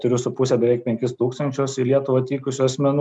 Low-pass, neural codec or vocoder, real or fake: 10.8 kHz; none; real